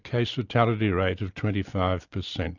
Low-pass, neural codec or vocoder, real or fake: 7.2 kHz; none; real